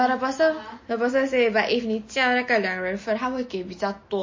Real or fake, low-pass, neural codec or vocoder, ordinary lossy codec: real; 7.2 kHz; none; MP3, 32 kbps